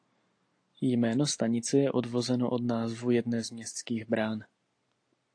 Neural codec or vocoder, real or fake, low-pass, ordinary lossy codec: none; real; 9.9 kHz; AAC, 48 kbps